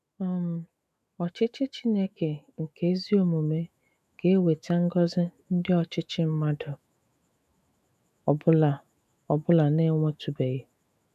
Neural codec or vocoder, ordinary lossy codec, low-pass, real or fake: autoencoder, 48 kHz, 128 numbers a frame, DAC-VAE, trained on Japanese speech; none; 14.4 kHz; fake